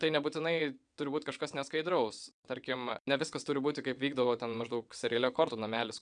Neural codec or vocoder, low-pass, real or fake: vocoder, 22.05 kHz, 80 mel bands, WaveNeXt; 9.9 kHz; fake